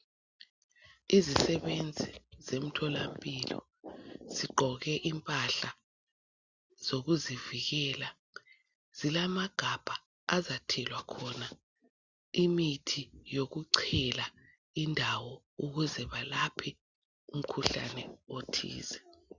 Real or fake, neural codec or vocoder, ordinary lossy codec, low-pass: real; none; Opus, 64 kbps; 7.2 kHz